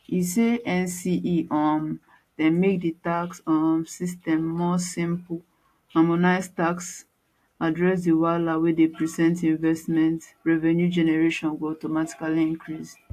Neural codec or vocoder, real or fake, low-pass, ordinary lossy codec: none; real; 14.4 kHz; AAC, 64 kbps